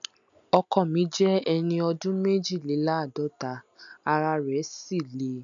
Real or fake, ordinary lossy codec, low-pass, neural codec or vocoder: real; none; 7.2 kHz; none